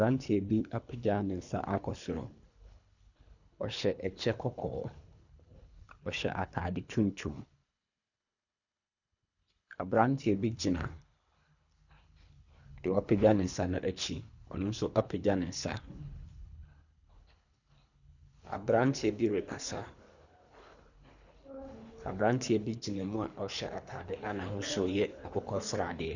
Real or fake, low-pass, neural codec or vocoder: fake; 7.2 kHz; codec, 24 kHz, 3 kbps, HILCodec